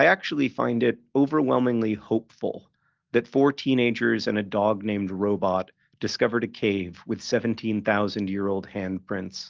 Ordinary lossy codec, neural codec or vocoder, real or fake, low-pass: Opus, 16 kbps; none; real; 7.2 kHz